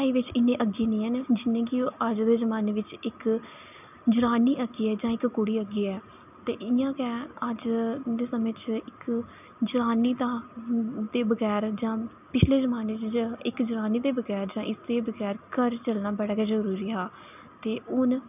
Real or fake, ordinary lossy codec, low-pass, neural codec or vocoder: real; none; 3.6 kHz; none